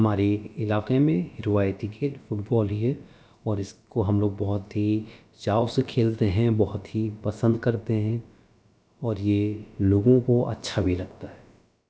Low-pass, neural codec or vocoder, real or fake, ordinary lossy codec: none; codec, 16 kHz, about 1 kbps, DyCAST, with the encoder's durations; fake; none